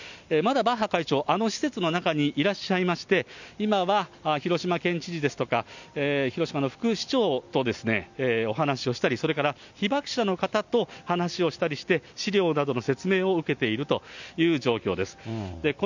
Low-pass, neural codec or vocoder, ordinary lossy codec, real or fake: 7.2 kHz; none; none; real